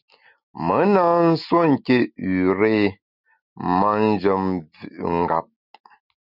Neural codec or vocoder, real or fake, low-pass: none; real; 5.4 kHz